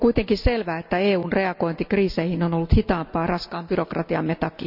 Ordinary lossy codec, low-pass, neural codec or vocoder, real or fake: none; 5.4 kHz; none; real